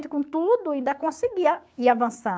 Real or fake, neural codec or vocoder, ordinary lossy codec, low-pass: fake; codec, 16 kHz, 6 kbps, DAC; none; none